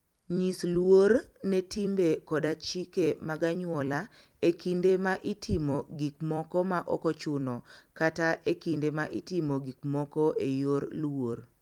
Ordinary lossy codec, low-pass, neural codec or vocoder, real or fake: Opus, 32 kbps; 19.8 kHz; vocoder, 44.1 kHz, 128 mel bands every 256 samples, BigVGAN v2; fake